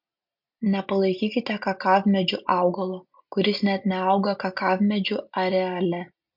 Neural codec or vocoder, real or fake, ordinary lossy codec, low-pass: none; real; MP3, 48 kbps; 5.4 kHz